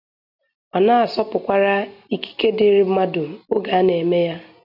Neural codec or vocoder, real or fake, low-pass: none; real; 5.4 kHz